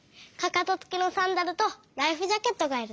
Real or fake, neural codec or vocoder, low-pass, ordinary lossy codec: real; none; none; none